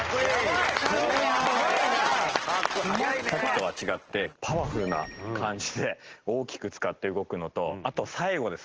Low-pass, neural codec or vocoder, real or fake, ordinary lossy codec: 7.2 kHz; none; real; Opus, 16 kbps